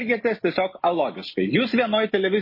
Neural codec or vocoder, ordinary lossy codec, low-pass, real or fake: none; MP3, 24 kbps; 5.4 kHz; real